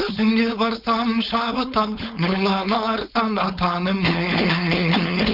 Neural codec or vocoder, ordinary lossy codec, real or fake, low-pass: codec, 16 kHz, 4.8 kbps, FACodec; none; fake; 5.4 kHz